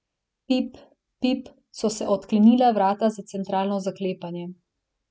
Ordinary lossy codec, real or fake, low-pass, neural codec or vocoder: none; real; none; none